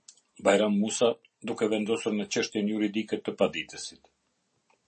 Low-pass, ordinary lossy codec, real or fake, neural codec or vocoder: 10.8 kHz; MP3, 32 kbps; real; none